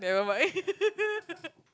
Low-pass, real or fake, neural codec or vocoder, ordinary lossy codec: none; real; none; none